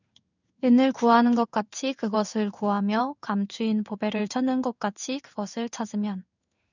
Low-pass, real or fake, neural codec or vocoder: 7.2 kHz; fake; codec, 16 kHz in and 24 kHz out, 1 kbps, XY-Tokenizer